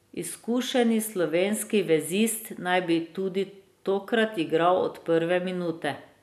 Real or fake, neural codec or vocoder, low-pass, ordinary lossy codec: real; none; 14.4 kHz; none